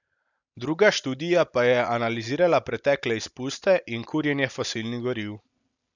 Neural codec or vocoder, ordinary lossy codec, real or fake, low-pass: none; none; real; 7.2 kHz